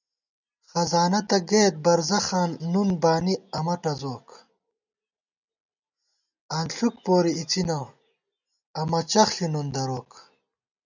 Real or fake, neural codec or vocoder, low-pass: real; none; 7.2 kHz